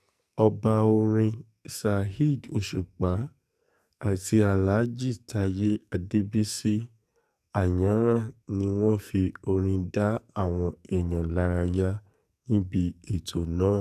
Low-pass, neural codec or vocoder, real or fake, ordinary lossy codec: 14.4 kHz; codec, 44.1 kHz, 2.6 kbps, SNAC; fake; none